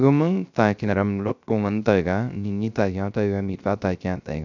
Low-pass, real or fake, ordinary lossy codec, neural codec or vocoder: 7.2 kHz; fake; none; codec, 16 kHz, 0.3 kbps, FocalCodec